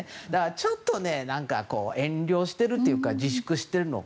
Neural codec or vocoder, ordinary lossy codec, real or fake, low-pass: none; none; real; none